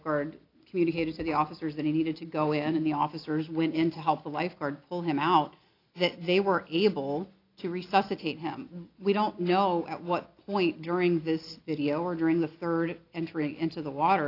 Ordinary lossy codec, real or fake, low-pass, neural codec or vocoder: AAC, 32 kbps; real; 5.4 kHz; none